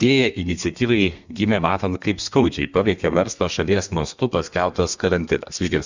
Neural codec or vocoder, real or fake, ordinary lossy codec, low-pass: codec, 32 kHz, 1.9 kbps, SNAC; fake; Opus, 64 kbps; 7.2 kHz